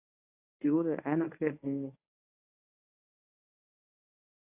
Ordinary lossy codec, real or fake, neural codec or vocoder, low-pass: AAC, 32 kbps; fake; codec, 24 kHz, 0.9 kbps, WavTokenizer, medium speech release version 1; 3.6 kHz